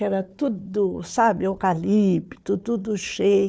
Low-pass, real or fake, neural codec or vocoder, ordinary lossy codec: none; fake; codec, 16 kHz, 4 kbps, FunCodec, trained on Chinese and English, 50 frames a second; none